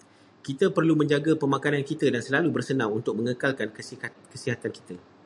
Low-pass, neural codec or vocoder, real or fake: 10.8 kHz; none; real